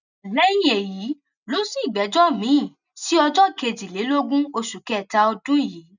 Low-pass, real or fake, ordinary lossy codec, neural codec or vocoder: 7.2 kHz; real; none; none